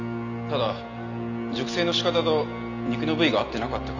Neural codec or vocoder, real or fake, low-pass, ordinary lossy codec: none; real; 7.2 kHz; none